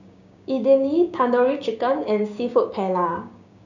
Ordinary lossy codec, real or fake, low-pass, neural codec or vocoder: none; fake; 7.2 kHz; codec, 16 kHz, 6 kbps, DAC